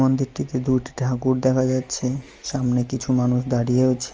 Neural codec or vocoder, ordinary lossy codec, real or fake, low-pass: none; Opus, 24 kbps; real; 7.2 kHz